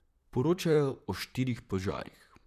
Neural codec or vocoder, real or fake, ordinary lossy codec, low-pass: vocoder, 44.1 kHz, 128 mel bands, Pupu-Vocoder; fake; none; 14.4 kHz